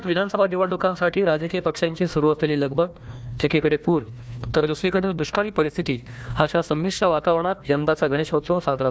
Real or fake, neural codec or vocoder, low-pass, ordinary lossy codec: fake; codec, 16 kHz, 1 kbps, FunCodec, trained on Chinese and English, 50 frames a second; none; none